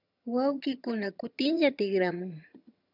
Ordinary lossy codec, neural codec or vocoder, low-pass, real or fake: AAC, 48 kbps; vocoder, 22.05 kHz, 80 mel bands, HiFi-GAN; 5.4 kHz; fake